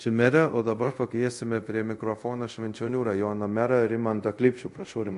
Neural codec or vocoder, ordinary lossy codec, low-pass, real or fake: codec, 24 kHz, 0.5 kbps, DualCodec; MP3, 48 kbps; 10.8 kHz; fake